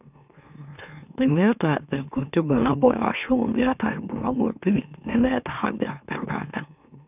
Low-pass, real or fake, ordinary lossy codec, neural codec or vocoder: 3.6 kHz; fake; none; autoencoder, 44.1 kHz, a latent of 192 numbers a frame, MeloTTS